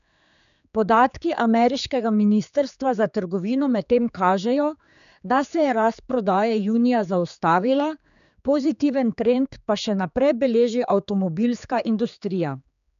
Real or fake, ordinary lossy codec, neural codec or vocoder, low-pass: fake; none; codec, 16 kHz, 4 kbps, X-Codec, HuBERT features, trained on general audio; 7.2 kHz